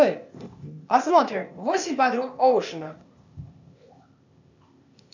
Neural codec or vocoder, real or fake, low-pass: codec, 16 kHz, 0.8 kbps, ZipCodec; fake; 7.2 kHz